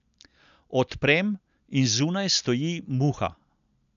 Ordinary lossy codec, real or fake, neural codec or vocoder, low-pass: none; real; none; 7.2 kHz